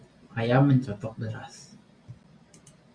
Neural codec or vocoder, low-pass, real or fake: none; 9.9 kHz; real